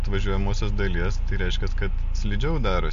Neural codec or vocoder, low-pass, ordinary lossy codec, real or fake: none; 7.2 kHz; MP3, 48 kbps; real